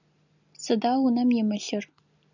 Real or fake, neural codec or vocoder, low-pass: real; none; 7.2 kHz